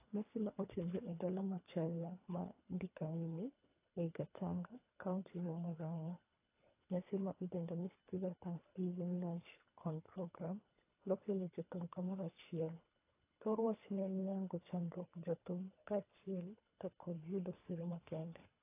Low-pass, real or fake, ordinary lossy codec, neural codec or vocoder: 3.6 kHz; fake; AAC, 24 kbps; codec, 24 kHz, 3 kbps, HILCodec